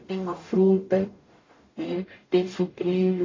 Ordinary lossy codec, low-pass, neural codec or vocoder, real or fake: AAC, 32 kbps; 7.2 kHz; codec, 44.1 kHz, 0.9 kbps, DAC; fake